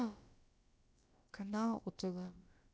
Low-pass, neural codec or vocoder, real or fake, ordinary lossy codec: none; codec, 16 kHz, about 1 kbps, DyCAST, with the encoder's durations; fake; none